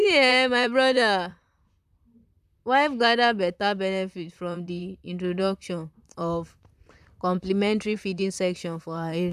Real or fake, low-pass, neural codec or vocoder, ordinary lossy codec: fake; 14.4 kHz; vocoder, 44.1 kHz, 128 mel bands, Pupu-Vocoder; none